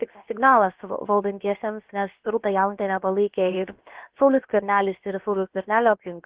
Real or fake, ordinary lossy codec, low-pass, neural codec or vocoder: fake; Opus, 16 kbps; 3.6 kHz; codec, 16 kHz, about 1 kbps, DyCAST, with the encoder's durations